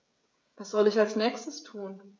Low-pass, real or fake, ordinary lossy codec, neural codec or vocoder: none; fake; none; codec, 16 kHz, 16 kbps, FreqCodec, smaller model